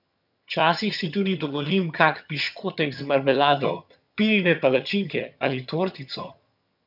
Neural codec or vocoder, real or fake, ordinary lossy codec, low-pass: vocoder, 22.05 kHz, 80 mel bands, HiFi-GAN; fake; none; 5.4 kHz